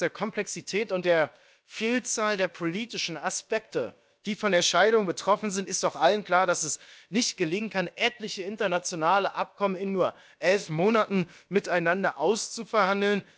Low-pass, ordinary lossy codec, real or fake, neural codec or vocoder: none; none; fake; codec, 16 kHz, about 1 kbps, DyCAST, with the encoder's durations